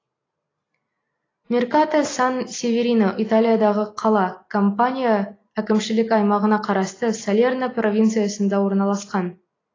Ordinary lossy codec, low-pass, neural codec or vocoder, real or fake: AAC, 32 kbps; 7.2 kHz; none; real